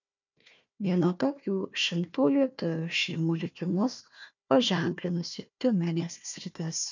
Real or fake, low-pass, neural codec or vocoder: fake; 7.2 kHz; codec, 16 kHz, 1 kbps, FunCodec, trained on Chinese and English, 50 frames a second